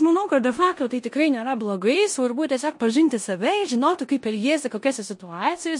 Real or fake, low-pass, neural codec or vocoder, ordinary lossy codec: fake; 10.8 kHz; codec, 16 kHz in and 24 kHz out, 0.9 kbps, LongCat-Audio-Codec, four codebook decoder; MP3, 48 kbps